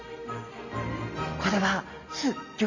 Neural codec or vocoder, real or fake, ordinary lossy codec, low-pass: none; real; Opus, 64 kbps; 7.2 kHz